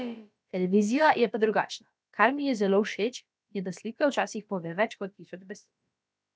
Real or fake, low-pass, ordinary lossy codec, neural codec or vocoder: fake; none; none; codec, 16 kHz, about 1 kbps, DyCAST, with the encoder's durations